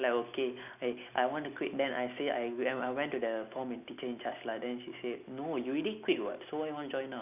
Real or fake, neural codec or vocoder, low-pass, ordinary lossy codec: real; none; 3.6 kHz; none